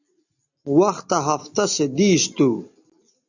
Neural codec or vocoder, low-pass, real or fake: none; 7.2 kHz; real